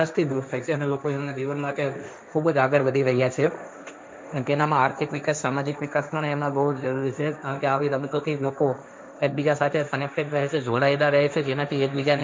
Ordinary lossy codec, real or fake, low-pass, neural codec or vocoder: none; fake; none; codec, 16 kHz, 1.1 kbps, Voila-Tokenizer